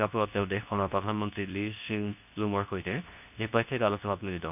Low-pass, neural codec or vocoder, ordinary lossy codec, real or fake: 3.6 kHz; codec, 24 kHz, 0.9 kbps, WavTokenizer, medium speech release version 2; none; fake